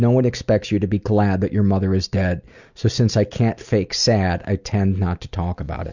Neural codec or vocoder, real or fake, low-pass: none; real; 7.2 kHz